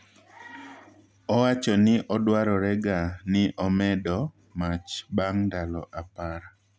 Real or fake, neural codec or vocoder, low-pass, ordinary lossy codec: real; none; none; none